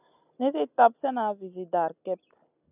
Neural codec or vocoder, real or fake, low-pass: none; real; 3.6 kHz